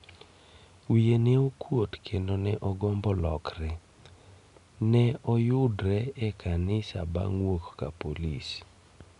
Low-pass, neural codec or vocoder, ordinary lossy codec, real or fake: 10.8 kHz; none; none; real